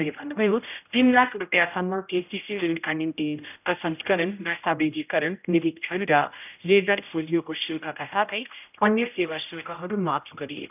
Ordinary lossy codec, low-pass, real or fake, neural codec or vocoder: none; 3.6 kHz; fake; codec, 16 kHz, 0.5 kbps, X-Codec, HuBERT features, trained on general audio